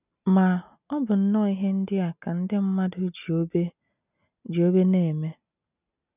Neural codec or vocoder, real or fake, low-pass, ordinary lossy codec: none; real; 3.6 kHz; none